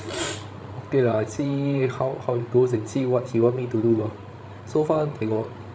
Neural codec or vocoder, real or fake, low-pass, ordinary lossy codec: codec, 16 kHz, 16 kbps, FreqCodec, larger model; fake; none; none